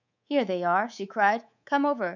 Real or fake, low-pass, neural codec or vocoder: fake; 7.2 kHz; codec, 24 kHz, 3.1 kbps, DualCodec